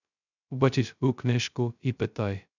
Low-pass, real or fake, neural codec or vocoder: 7.2 kHz; fake; codec, 16 kHz, 0.2 kbps, FocalCodec